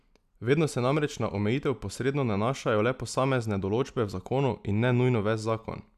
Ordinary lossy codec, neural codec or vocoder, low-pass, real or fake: none; none; 14.4 kHz; real